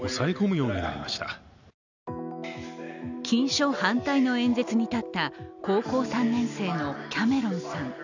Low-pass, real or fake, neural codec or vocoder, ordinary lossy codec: 7.2 kHz; real; none; none